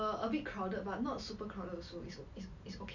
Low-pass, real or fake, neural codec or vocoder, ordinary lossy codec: 7.2 kHz; real; none; none